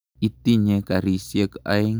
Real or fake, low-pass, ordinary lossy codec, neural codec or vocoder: real; none; none; none